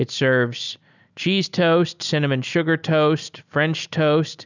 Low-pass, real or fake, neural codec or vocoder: 7.2 kHz; real; none